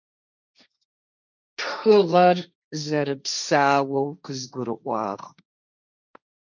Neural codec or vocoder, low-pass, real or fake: codec, 16 kHz, 1.1 kbps, Voila-Tokenizer; 7.2 kHz; fake